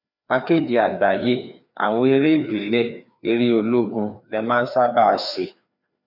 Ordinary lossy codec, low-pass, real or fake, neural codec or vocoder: none; 5.4 kHz; fake; codec, 16 kHz, 2 kbps, FreqCodec, larger model